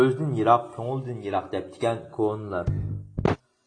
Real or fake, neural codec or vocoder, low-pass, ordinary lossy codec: real; none; 9.9 kHz; AAC, 32 kbps